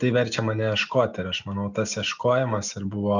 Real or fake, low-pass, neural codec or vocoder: real; 7.2 kHz; none